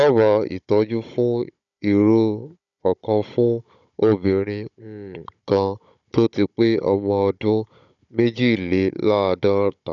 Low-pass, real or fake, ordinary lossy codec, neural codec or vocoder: 7.2 kHz; fake; none; codec, 16 kHz, 16 kbps, FunCodec, trained on Chinese and English, 50 frames a second